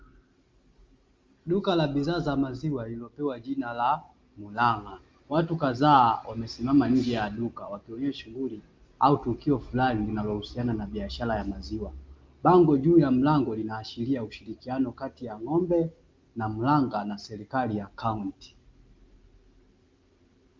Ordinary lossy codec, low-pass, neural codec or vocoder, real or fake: Opus, 32 kbps; 7.2 kHz; none; real